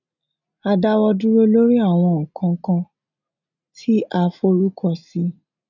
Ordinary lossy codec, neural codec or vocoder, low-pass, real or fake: none; none; 7.2 kHz; real